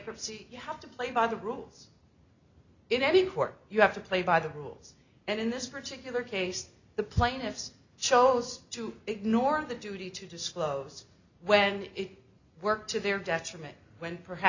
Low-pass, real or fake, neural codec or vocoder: 7.2 kHz; real; none